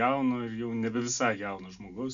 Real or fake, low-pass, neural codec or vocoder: real; 7.2 kHz; none